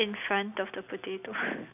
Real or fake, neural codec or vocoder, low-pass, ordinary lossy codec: real; none; 3.6 kHz; none